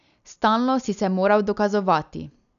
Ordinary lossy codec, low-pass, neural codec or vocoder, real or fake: none; 7.2 kHz; none; real